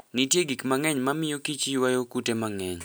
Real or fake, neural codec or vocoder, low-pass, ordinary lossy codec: real; none; none; none